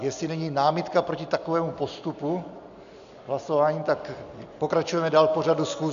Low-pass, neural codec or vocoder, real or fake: 7.2 kHz; none; real